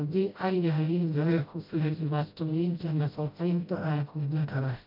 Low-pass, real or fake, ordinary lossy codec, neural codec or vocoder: 5.4 kHz; fake; MP3, 48 kbps; codec, 16 kHz, 0.5 kbps, FreqCodec, smaller model